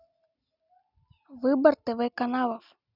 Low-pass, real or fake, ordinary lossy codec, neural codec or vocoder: 5.4 kHz; real; none; none